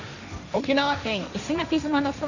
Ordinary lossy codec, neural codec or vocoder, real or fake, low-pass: none; codec, 16 kHz, 1.1 kbps, Voila-Tokenizer; fake; 7.2 kHz